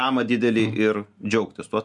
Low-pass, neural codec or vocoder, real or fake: 10.8 kHz; none; real